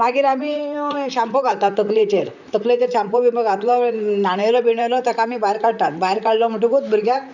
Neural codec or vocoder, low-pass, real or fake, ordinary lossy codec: vocoder, 44.1 kHz, 128 mel bands, Pupu-Vocoder; 7.2 kHz; fake; none